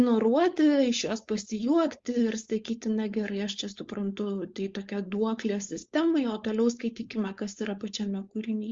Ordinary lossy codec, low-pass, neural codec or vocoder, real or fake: Opus, 32 kbps; 7.2 kHz; codec, 16 kHz, 4.8 kbps, FACodec; fake